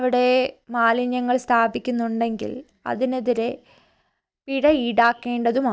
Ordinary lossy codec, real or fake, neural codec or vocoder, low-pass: none; real; none; none